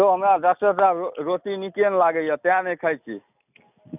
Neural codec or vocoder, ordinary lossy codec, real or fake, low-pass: none; none; real; 3.6 kHz